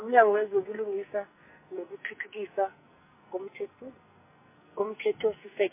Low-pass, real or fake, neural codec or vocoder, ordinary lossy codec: 3.6 kHz; fake; codec, 44.1 kHz, 2.6 kbps, SNAC; MP3, 24 kbps